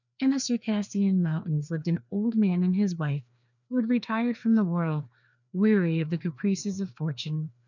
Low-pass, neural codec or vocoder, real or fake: 7.2 kHz; codec, 16 kHz, 2 kbps, FreqCodec, larger model; fake